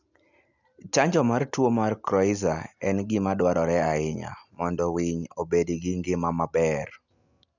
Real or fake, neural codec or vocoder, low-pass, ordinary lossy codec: real; none; 7.2 kHz; none